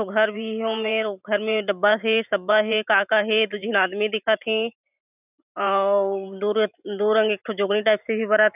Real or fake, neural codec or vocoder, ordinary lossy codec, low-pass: fake; vocoder, 44.1 kHz, 128 mel bands every 512 samples, BigVGAN v2; none; 3.6 kHz